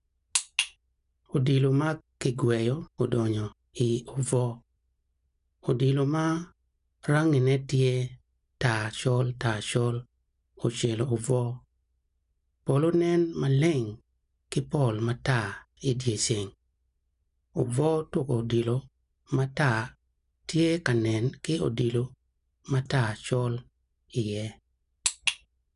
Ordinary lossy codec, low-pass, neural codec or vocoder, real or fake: none; 10.8 kHz; none; real